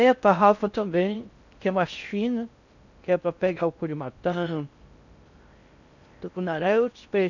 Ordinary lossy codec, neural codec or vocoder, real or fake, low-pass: none; codec, 16 kHz in and 24 kHz out, 0.6 kbps, FocalCodec, streaming, 4096 codes; fake; 7.2 kHz